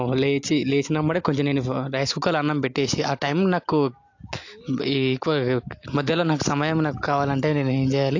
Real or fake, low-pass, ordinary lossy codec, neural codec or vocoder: real; 7.2 kHz; AAC, 48 kbps; none